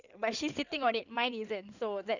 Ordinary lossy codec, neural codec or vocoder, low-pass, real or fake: none; codec, 16 kHz in and 24 kHz out, 2.2 kbps, FireRedTTS-2 codec; 7.2 kHz; fake